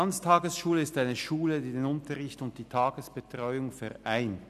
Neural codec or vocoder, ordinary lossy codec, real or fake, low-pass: none; none; real; 14.4 kHz